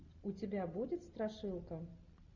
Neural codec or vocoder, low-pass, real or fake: none; 7.2 kHz; real